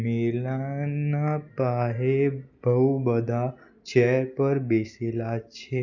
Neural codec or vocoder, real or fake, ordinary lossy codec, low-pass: none; real; none; 7.2 kHz